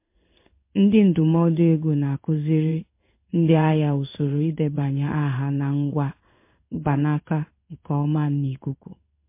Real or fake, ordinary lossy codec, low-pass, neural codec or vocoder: fake; MP3, 24 kbps; 3.6 kHz; codec, 16 kHz in and 24 kHz out, 1 kbps, XY-Tokenizer